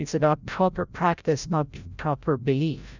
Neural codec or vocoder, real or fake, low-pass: codec, 16 kHz, 0.5 kbps, FreqCodec, larger model; fake; 7.2 kHz